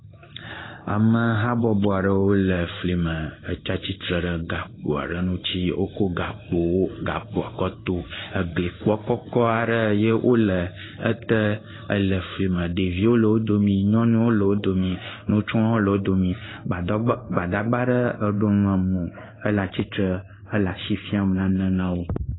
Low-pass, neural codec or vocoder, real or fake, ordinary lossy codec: 7.2 kHz; codec, 16 kHz in and 24 kHz out, 1 kbps, XY-Tokenizer; fake; AAC, 16 kbps